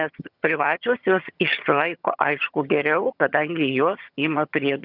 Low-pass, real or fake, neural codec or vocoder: 5.4 kHz; fake; vocoder, 22.05 kHz, 80 mel bands, HiFi-GAN